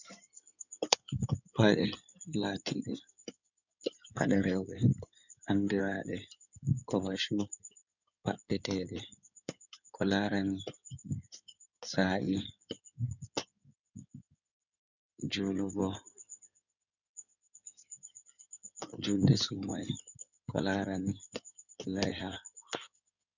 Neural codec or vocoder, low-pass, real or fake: codec, 16 kHz in and 24 kHz out, 2.2 kbps, FireRedTTS-2 codec; 7.2 kHz; fake